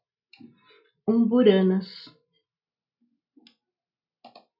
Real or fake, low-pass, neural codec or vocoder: real; 5.4 kHz; none